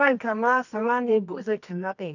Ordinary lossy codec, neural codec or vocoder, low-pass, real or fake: none; codec, 24 kHz, 0.9 kbps, WavTokenizer, medium music audio release; 7.2 kHz; fake